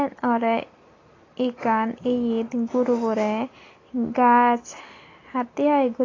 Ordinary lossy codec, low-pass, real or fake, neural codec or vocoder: AAC, 32 kbps; 7.2 kHz; real; none